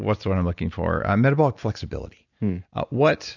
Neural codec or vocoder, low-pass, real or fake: none; 7.2 kHz; real